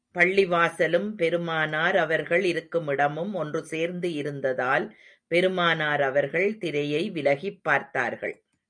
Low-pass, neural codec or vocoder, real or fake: 9.9 kHz; none; real